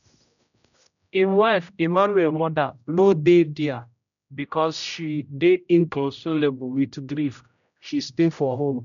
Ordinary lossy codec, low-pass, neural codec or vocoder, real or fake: none; 7.2 kHz; codec, 16 kHz, 0.5 kbps, X-Codec, HuBERT features, trained on general audio; fake